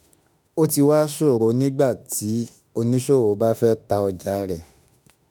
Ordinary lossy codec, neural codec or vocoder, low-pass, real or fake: none; autoencoder, 48 kHz, 32 numbers a frame, DAC-VAE, trained on Japanese speech; none; fake